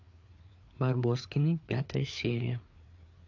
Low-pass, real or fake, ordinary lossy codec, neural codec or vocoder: 7.2 kHz; fake; AAC, 48 kbps; codec, 16 kHz, 4 kbps, FreqCodec, larger model